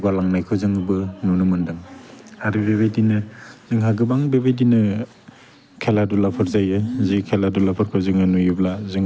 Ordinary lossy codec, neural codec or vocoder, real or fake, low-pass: none; none; real; none